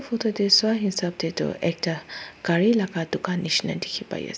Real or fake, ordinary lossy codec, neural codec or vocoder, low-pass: real; none; none; none